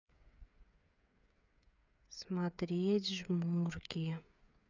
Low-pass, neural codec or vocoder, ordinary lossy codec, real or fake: 7.2 kHz; codec, 16 kHz, 8 kbps, FreqCodec, smaller model; none; fake